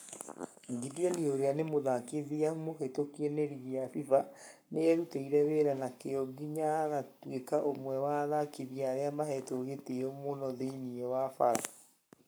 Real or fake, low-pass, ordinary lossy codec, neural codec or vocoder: fake; none; none; codec, 44.1 kHz, 7.8 kbps, Pupu-Codec